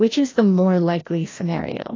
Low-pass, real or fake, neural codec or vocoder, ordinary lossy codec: 7.2 kHz; fake; codec, 16 kHz, 1 kbps, FreqCodec, larger model; AAC, 32 kbps